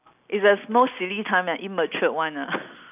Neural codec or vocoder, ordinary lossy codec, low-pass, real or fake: none; none; 3.6 kHz; real